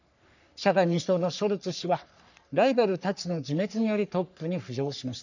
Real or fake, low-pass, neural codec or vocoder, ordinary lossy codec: fake; 7.2 kHz; codec, 44.1 kHz, 3.4 kbps, Pupu-Codec; none